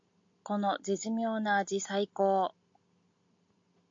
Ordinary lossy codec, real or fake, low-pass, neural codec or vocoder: AAC, 48 kbps; real; 7.2 kHz; none